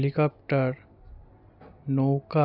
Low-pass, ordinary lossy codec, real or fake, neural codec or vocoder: 5.4 kHz; none; real; none